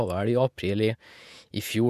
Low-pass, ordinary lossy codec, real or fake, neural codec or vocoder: 14.4 kHz; none; fake; vocoder, 48 kHz, 128 mel bands, Vocos